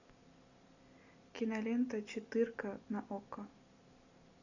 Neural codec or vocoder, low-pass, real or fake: none; 7.2 kHz; real